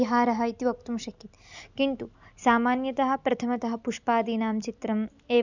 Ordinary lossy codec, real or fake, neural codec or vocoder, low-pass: none; real; none; 7.2 kHz